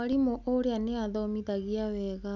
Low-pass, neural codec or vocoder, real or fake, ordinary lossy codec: 7.2 kHz; none; real; none